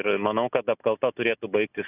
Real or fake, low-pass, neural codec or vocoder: real; 3.6 kHz; none